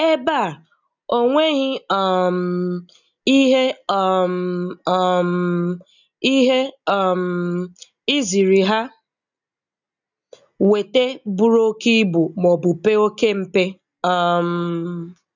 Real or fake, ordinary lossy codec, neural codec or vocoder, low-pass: real; none; none; 7.2 kHz